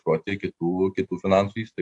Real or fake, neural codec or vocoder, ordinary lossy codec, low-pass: real; none; AAC, 48 kbps; 10.8 kHz